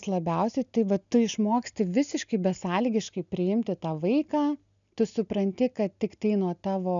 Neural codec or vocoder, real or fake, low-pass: none; real; 7.2 kHz